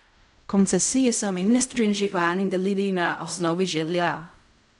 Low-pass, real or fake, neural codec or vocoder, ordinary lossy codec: 10.8 kHz; fake; codec, 16 kHz in and 24 kHz out, 0.4 kbps, LongCat-Audio-Codec, fine tuned four codebook decoder; none